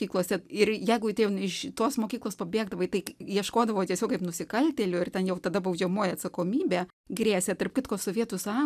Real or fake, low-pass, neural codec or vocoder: real; 14.4 kHz; none